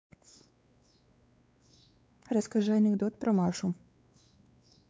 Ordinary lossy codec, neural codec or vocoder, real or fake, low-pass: none; codec, 16 kHz, 4 kbps, X-Codec, WavLM features, trained on Multilingual LibriSpeech; fake; none